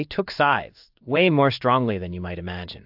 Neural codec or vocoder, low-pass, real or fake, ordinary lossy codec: codec, 16 kHz in and 24 kHz out, 1 kbps, XY-Tokenizer; 5.4 kHz; fake; AAC, 48 kbps